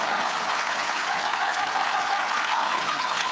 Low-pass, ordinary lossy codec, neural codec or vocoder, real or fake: none; none; codec, 16 kHz, 4 kbps, FreqCodec, larger model; fake